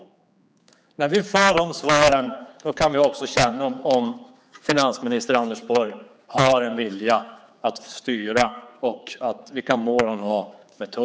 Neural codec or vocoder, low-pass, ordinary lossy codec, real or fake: codec, 16 kHz, 4 kbps, X-Codec, HuBERT features, trained on general audio; none; none; fake